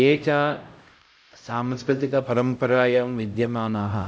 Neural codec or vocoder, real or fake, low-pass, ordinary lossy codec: codec, 16 kHz, 0.5 kbps, X-Codec, HuBERT features, trained on LibriSpeech; fake; none; none